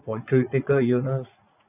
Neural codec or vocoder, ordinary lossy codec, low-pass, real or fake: vocoder, 44.1 kHz, 80 mel bands, Vocos; none; 3.6 kHz; fake